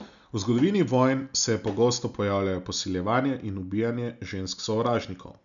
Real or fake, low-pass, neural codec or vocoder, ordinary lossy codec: real; 7.2 kHz; none; none